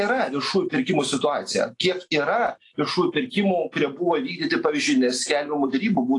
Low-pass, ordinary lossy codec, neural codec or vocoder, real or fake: 10.8 kHz; AAC, 48 kbps; none; real